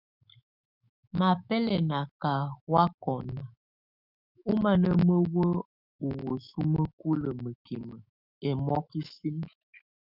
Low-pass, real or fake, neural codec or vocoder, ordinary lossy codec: 5.4 kHz; fake; autoencoder, 48 kHz, 128 numbers a frame, DAC-VAE, trained on Japanese speech; Opus, 64 kbps